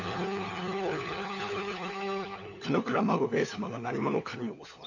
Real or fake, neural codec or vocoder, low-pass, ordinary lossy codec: fake; codec, 16 kHz, 4 kbps, FunCodec, trained on LibriTTS, 50 frames a second; 7.2 kHz; none